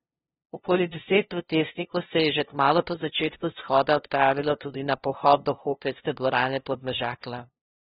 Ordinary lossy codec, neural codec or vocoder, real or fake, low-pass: AAC, 16 kbps; codec, 16 kHz, 0.5 kbps, FunCodec, trained on LibriTTS, 25 frames a second; fake; 7.2 kHz